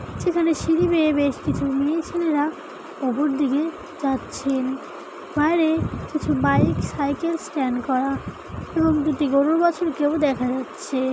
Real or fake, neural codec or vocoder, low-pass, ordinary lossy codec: real; none; none; none